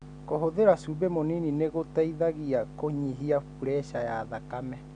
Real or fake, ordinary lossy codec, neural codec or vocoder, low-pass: real; none; none; 9.9 kHz